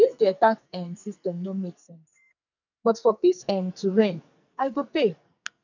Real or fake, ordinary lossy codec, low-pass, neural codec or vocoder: fake; none; 7.2 kHz; codec, 44.1 kHz, 2.6 kbps, SNAC